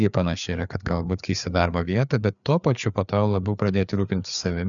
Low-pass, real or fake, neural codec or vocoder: 7.2 kHz; fake; codec, 16 kHz, 4 kbps, FreqCodec, larger model